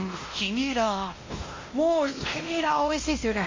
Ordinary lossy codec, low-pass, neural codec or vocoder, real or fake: MP3, 32 kbps; 7.2 kHz; codec, 16 kHz, 1 kbps, X-Codec, WavLM features, trained on Multilingual LibriSpeech; fake